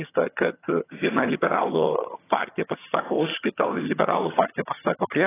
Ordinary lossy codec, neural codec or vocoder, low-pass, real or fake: AAC, 16 kbps; vocoder, 22.05 kHz, 80 mel bands, HiFi-GAN; 3.6 kHz; fake